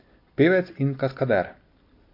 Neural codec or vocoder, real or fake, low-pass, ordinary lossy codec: none; real; 5.4 kHz; MP3, 32 kbps